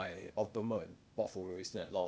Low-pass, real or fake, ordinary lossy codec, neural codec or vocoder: none; fake; none; codec, 16 kHz, 0.8 kbps, ZipCodec